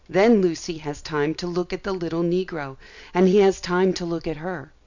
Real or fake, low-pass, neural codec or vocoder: real; 7.2 kHz; none